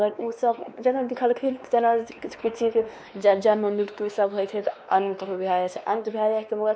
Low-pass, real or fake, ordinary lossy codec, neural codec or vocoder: none; fake; none; codec, 16 kHz, 2 kbps, X-Codec, WavLM features, trained on Multilingual LibriSpeech